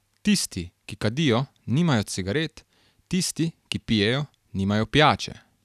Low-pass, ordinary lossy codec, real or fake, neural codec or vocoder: 14.4 kHz; none; real; none